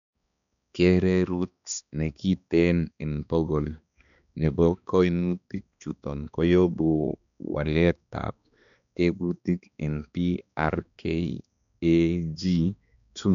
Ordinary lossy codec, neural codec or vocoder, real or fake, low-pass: none; codec, 16 kHz, 2 kbps, X-Codec, HuBERT features, trained on balanced general audio; fake; 7.2 kHz